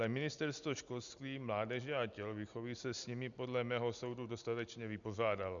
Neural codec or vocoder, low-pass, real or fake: none; 7.2 kHz; real